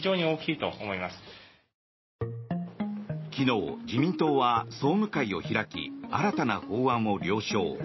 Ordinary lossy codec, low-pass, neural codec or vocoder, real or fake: MP3, 24 kbps; 7.2 kHz; none; real